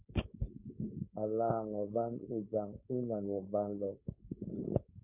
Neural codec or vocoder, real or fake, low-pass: codec, 16 kHz, 4.8 kbps, FACodec; fake; 3.6 kHz